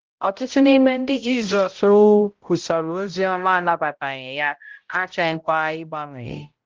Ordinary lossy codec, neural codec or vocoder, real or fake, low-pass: Opus, 16 kbps; codec, 16 kHz, 0.5 kbps, X-Codec, HuBERT features, trained on balanced general audio; fake; 7.2 kHz